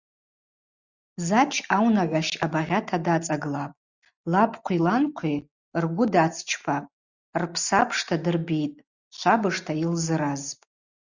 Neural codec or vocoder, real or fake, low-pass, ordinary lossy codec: none; real; 7.2 kHz; Opus, 64 kbps